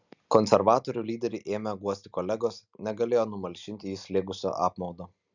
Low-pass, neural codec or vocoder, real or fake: 7.2 kHz; none; real